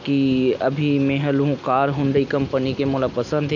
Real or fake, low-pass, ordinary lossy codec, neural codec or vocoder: real; 7.2 kHz; none; none